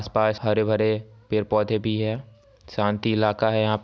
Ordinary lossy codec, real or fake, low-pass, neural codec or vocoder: none; real; none; none